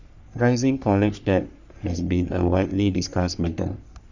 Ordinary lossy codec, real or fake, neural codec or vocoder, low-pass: none; fake; codec, 44.1 kHz, 3.4 kbps, Pupu-Codec; 7.2 kHz